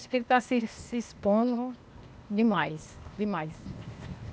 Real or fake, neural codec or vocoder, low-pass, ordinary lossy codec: fake; codec, 16 kHz, 0.8 kbps, ZipCodec; none; none